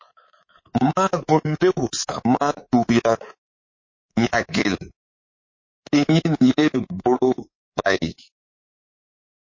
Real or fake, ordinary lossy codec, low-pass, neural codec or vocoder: fake; MP3, 32 kbps; 7.2 kHz; codec, 24 kHz, 3.1 kbps, DualCodec